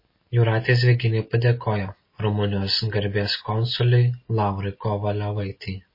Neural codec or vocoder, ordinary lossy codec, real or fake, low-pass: none; MP3, 24 kbps; real; 5.4 kHz